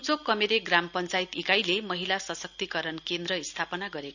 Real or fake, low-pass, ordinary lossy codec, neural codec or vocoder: real; 7.2 kHz; none; none